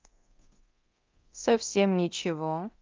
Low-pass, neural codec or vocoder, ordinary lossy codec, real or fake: 7.2 kHz; codec, 24 kHz, 0.9 kbps, DualCodec; Opus, 24 kbps; fake